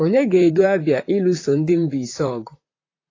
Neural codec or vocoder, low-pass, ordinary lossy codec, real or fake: vocoder, 44.1 kHz, 128 mel bands, Pupu-Vocoder; 7.2 kHz; AAC, 32 kbps; fake